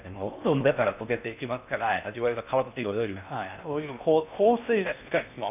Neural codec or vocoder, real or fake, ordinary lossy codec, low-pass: codec, 16 kHz in and 24 kHz out, 0.6 kbps, FocalCodec, streaming, 4096 codes; fake; none; 3.6 kHz